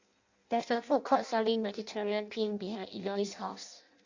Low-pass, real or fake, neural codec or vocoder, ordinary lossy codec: 7.2 kHz; fake; codec, 16 kHz in and 24 kHz out, 0.6 kbps, FireRedTTS-2 codec; Opus, 64 kbps